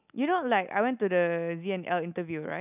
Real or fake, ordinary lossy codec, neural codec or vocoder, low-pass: real; none; none; 3.6 kHz